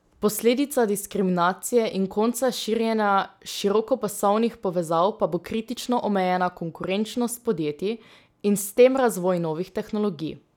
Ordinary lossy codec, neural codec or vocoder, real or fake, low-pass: none; none; real; 19.8 kHz